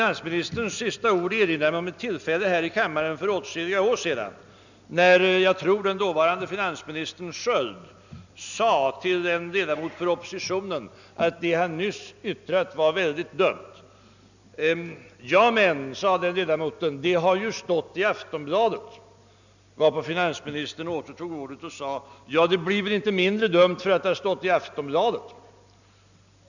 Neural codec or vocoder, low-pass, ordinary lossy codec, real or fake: none; 7.2 kHz; none; real